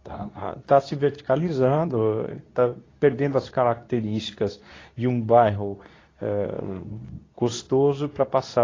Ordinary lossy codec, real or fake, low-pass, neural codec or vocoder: AAC, 32 kbps; fake; 7.2 kHz; codec, 24 kHz, 0.9 kbps, WavTokenizer, medium speech release version 2